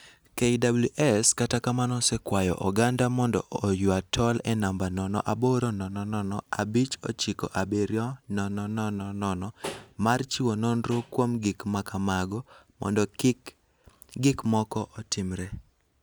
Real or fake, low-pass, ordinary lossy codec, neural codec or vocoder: real; none; none; none